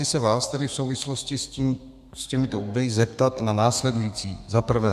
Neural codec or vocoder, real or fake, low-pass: codec, 32 kHz, 1.9 kbps, SNAC; fake; 14.4 kHz